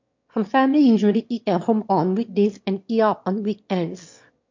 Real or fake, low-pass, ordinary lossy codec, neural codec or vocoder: fake; 7.2 kHz; MP3, 48 kbps; autoencoder, 22.05 kHz, a latent of 192 numbers a frame, VITS, trained on one speaker